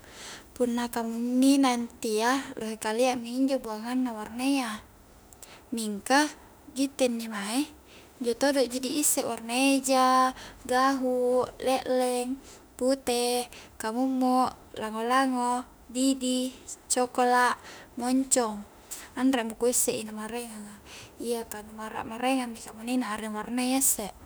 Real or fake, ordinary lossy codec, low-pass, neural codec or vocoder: fake; none; none; autoencoder, 48 kHz, 32 numbers a frame, DAC-VAE, trained on Japanese speech